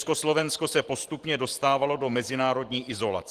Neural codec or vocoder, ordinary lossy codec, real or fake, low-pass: vocoder, 44.1 kHz, 128 mel bands every 512 samples, BigVGAN v2; Opus, 16 kbps; fake; 14.4 kHz